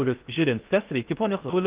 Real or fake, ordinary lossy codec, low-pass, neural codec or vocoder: fake; Opus, 32 kbps; 3.6 kHz; codec, 16 kHz in and 24 kHz out, 0.6 kbps, FocalCodec, streaming, 2048 codes